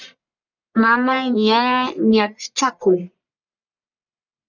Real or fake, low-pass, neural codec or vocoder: fake; 7.2 kHz; codec, 44.1 kHz, 1.7 kbps, Pupu-Codec